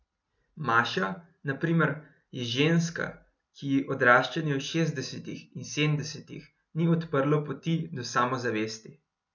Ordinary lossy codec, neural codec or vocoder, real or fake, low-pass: none; none; real; 7.2 kHz